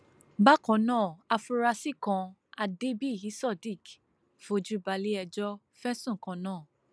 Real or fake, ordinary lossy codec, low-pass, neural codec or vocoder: real; none; none; none